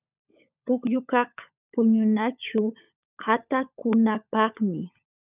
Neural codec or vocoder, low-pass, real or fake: codec, 16 kHz, 16 kbps, FunCodec, trained on LibriTTS, 50 frames a second; 3.6 kHz; fake